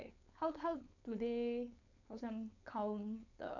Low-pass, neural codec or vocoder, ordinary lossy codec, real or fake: 7.2 kHz; codec, 16 kHz, 4.8 kbps, FACodec; none; fake